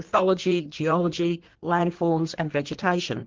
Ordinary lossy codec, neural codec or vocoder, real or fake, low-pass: Opus, 16 kbps; codec, 16 kHz in and 24 kHz out, 1.1 kbps, FireRedTTS-2 codec; fake; 7.2 kHz